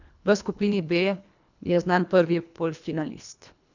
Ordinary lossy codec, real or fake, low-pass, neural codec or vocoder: none; fake; 7.2 kHz; codec, 24 kHz, 1.5 kbps, HILCodec